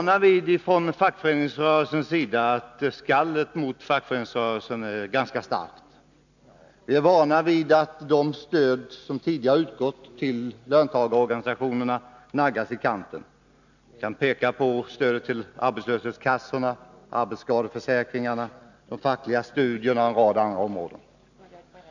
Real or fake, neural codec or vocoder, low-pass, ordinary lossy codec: real; none; 7.2 kHz; none